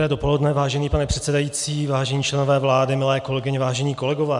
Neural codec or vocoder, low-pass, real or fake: none; 10.8 kHz; real